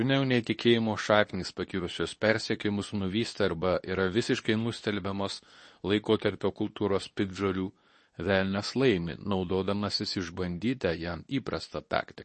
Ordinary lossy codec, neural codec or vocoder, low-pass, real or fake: MP3, 32 kbps; codec, 24 kHz, 0.9 kbps, WavTokenizer, medium speech release version 2; 10.8 kHz; fake